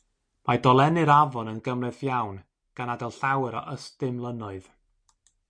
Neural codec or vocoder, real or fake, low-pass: none; real; 9.9 kHz